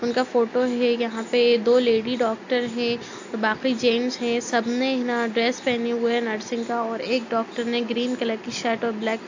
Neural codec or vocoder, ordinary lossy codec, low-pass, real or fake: none; AAC, 48 kbps; 7.2 kHz; real